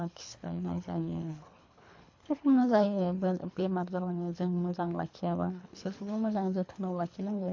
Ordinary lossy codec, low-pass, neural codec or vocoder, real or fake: AAC, 48 kbps; 7.2 kHz; codec, 24 kHz, 3 kbps, HILCodec; fake